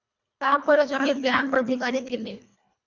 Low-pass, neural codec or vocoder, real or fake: 7.2 kHz; codec, 24 kHz, 1.5 kbps, HILCodec; fake